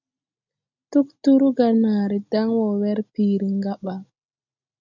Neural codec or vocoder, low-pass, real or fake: none; 7.2 kHz; real